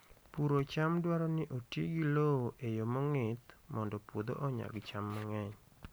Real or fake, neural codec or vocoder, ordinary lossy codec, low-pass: real; none; none; none